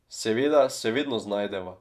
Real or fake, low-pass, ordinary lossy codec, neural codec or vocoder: fake; 14.4 kHz; none; vocoder, 48 kHz, 128 mel bands, Vocos